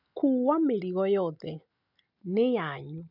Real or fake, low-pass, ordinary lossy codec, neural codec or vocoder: real; 5.4 kHz; none; none